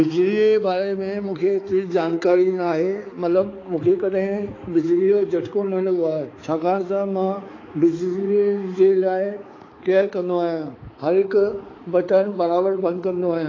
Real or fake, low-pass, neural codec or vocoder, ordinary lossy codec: fake; 7.2 kHz; codec, 16 kHz, 4 kbps, X-Codec, HuBERT features, trained on balanced general audio; AAC, 32 kbps